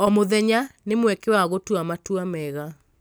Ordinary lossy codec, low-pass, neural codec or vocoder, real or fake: none; none; none; real